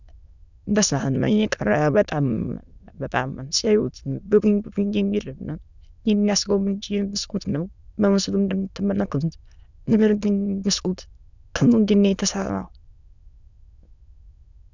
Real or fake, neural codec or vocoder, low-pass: fake; autoencoder, 22.05 kHz, a latent of 192 numbers a frame, VITS, trained on many speakers; 7.2 kHz